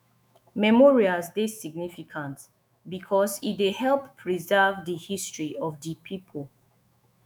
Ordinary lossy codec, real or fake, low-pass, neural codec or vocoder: none; fake; none; autoencoder, 48 kHz, 128 numbers a frame, DAC-VAE, trained on Japanese speech